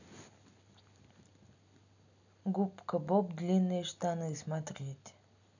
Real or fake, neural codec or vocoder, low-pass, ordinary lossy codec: real; none; 7.2 kHz; none